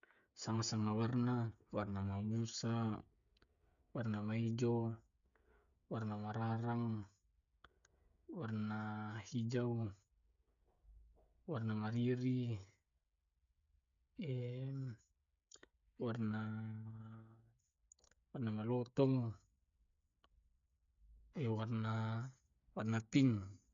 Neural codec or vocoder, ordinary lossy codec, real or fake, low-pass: codec, 16 kHz, 16 kbps, FreqCodec, smaller model; none; fake; 7.2 kHz